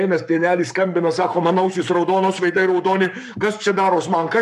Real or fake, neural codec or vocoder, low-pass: fake; codec, 44.1 kHz, 7.8 kbps, Pupu-Codec; 14.4 kHz